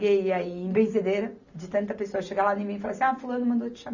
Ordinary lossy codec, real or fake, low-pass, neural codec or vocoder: none; real; 7.2 kHz; none